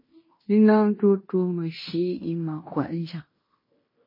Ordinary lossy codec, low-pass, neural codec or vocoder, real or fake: MP3, 24 kbps; 5.4 kHz; codec, 16 kHz in and 24 kHz out, 0.9 kbps, LongCat-Audio-Codec, fine tuned four codebook decoder; fake